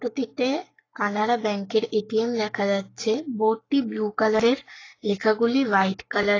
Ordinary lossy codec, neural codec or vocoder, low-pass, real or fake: AAC, 32 kbps; codec, 44.1 kHz, 7.8 kbps, Pupu-Codec; 7.2 kHz; fake